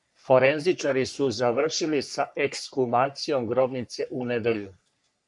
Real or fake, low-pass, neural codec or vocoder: fake; 10.8 kHz; codec, 44.1 kHz, 3.4 kbps, Pupu-Codec